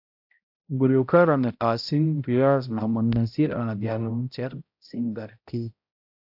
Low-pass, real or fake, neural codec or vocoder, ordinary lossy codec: 5.4 kHz; fake; codec, 16 kHz, 0.5 kbps, X-Codec, HuBERT features, trained on balanced general audio; AAC, 48 kbps